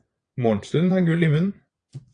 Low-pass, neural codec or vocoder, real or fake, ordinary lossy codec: 9.9 kHz; vocoder, 22.05 kHz, 80 mel bands, WaveNeXt; fake; AAC, 48 kbps